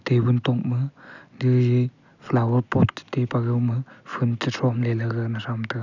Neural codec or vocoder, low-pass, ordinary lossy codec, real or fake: none; 7.2 kHz; none; real